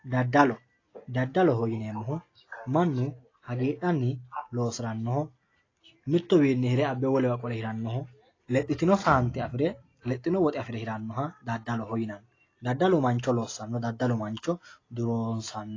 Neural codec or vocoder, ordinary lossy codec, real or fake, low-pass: none; AAC, 32 kbps; real; 7.2 kHz